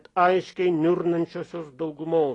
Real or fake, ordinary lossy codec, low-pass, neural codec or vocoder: real; AAC, 32 kbps; 10.8 kHz; none